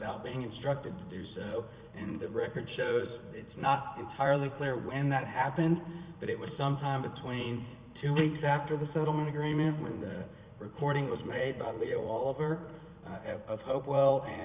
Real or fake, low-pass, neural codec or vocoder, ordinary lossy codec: fake; 3.6 kHz; vocoder, 44.1 kHz, 80 mel bands, Vocos; Opus, 64 kbps